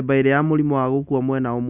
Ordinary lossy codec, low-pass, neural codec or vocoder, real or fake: Opus, 64 kbps; 3.6 kHz; none; real